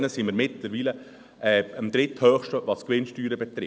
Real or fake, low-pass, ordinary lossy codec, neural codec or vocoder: real; none; none; none